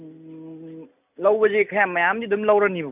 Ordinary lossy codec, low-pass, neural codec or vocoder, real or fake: none; 3.6 kHz; none; real